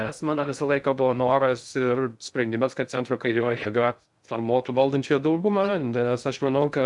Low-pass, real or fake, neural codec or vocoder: 10.8 kHz; fake; codec, 16 kHz in and 24 kHz out, 0.6 kbps, FocalCodec, streaming, 2048 codes